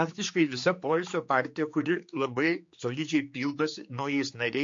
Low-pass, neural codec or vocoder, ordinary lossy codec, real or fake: 7.2 kHz; codec, 16 kHz, 4 kbps, X-Codec, HuBERT features, trained on general audio; MP3, 48 kbps; fake